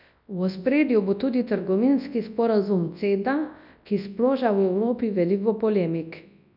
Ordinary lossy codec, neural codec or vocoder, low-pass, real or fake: none; codec, 24 kHz, 0.9 kbps, WavTokenizer, large speech release; 5.4 kHz; fake